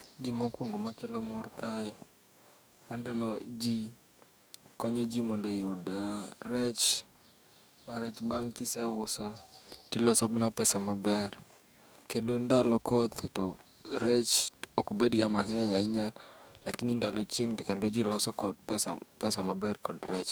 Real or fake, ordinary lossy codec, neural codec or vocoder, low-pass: fake; none; codec, 44.1 kHz, 2.6 kbps, DAC; none